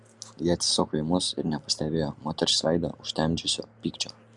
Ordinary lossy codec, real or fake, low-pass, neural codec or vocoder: Opus, 64 kbps; real; 10.8 kHz; none